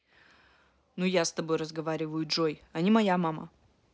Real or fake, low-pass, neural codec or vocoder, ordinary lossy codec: real; none; none; none